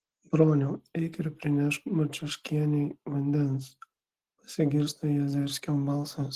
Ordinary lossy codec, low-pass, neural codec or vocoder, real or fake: Opus, 16 kbps; 14.4 kHz; none; real